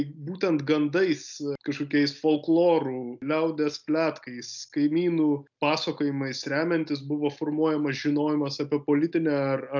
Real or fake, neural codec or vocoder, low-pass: real; none; 7.2 kHz